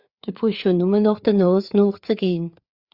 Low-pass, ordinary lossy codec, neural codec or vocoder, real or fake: 5.4 kHz; Opus, 64 kbps; codec, 16 kHz, 4 kbps, FreqCodec, larger model; fake